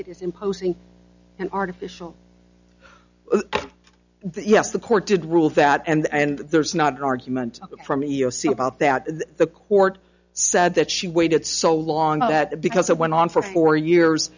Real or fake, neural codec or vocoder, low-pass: real; none; 7.2 kHz